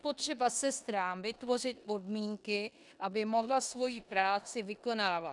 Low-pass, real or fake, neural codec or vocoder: 10.8 kHz; fake; codec, 16 kHz in and 24 kHz out, 0.9 kbps, LongCat-Audio-Codec, four codebook decoder